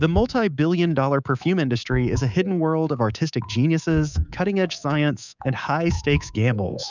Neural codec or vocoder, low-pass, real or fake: codec, 24 kHz, 3.1 kbps, DualCodec; 7.2 kHz; fake